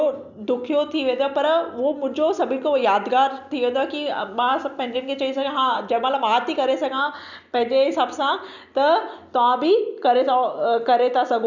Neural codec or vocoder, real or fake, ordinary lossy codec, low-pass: none; real; none; 7.2 kHz